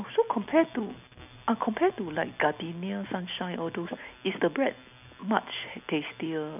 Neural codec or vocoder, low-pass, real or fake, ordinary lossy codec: none; 3.6 kHz; real; none